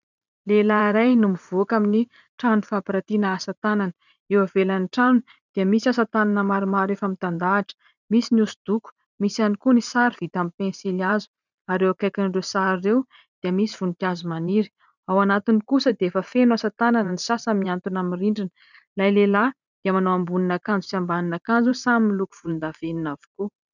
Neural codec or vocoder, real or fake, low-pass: vocoder, 44.1 kHz, 128 mel bands every 512 samples, BigVGAN v2; fake; 7.2 kHz